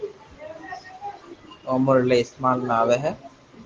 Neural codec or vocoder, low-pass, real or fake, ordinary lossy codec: none; 7.2 kHz; real; Opus, 16 kbps